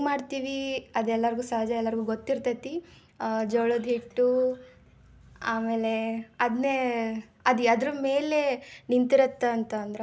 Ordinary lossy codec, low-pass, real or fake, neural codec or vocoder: none; none; real; none